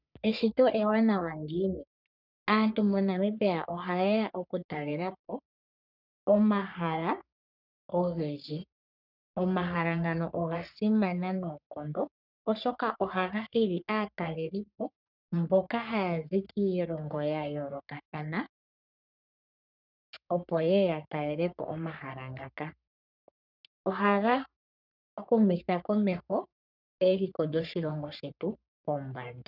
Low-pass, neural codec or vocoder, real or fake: 5.4 kHz; codec, 44.1 kHz, 3.4 kbps, Pupu-Codec; fake